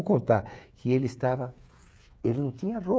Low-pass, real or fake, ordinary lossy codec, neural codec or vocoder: none; fake; none; codec, 16 kHz, 16 kbps, FreqCodec, smaller model